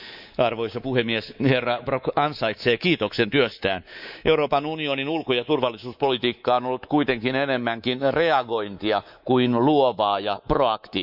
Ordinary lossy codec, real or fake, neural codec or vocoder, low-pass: Opus, 64 kbps; fake; codec, 24 kHz, 3.1 kbps, DualCodec; 5.4 kHz